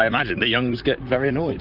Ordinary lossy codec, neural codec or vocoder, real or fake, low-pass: Opus, 24 kbps; vocoder, 44.1 kHz, 128 mel bands, Pupu-Vocoder; fake; 5.4 kHz